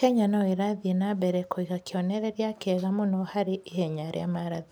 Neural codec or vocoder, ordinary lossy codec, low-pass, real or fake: none; none; none; real